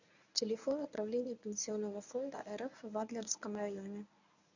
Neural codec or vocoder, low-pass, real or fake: codec, 24 kHz, 0.9 kbps, WavTokenizer, medium speech release version 1; 7.2 kHz; fake